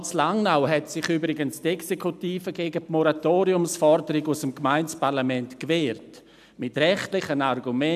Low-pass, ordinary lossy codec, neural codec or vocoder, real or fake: 14.4 kHz; none; none; real